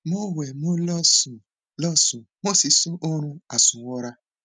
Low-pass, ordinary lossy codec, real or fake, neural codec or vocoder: 9.9 kHz; none; real; none